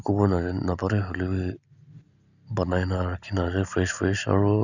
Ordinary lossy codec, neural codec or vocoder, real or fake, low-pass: none; none; real; 7.2 kHz